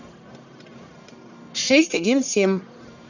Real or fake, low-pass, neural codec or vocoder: fake; 7.2 kHz; codec, 44.1 kHz, 1.7 kbps, Pupu-Codec